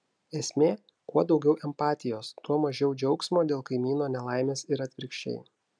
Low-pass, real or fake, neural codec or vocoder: 9.9 kHz; real; none